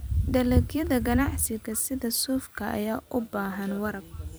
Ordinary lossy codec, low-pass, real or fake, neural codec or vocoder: none; none; fake; vocoder, 44.1 kHz, 128 mel bands every 256 samples, BigVGAN v2